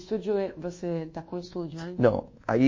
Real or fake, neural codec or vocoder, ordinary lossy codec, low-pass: fake; codec, 24 kHz, 1.2 kbps, DualCodec; MP3, 32 kbps; 7.2 kHz